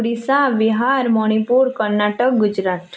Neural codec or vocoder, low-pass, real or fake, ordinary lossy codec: none; none; real; none